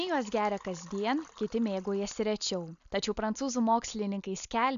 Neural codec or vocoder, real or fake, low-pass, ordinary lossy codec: none; real; 7.2 kHz; MP3, 96 kbps